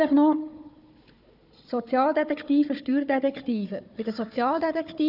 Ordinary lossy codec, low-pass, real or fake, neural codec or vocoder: none; 5.4 kHz; fake; codec, 16 kHz, 4 kbps, FunCodec, trained on Chinese and English, 50 frames a second